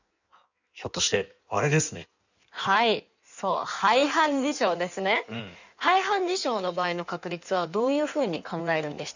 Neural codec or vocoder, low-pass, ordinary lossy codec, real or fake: codec, 16 kHz in and 24 kHz out, 1.1 kbps, FireRedTTS-2 codec; 7.2 kHz; none; fake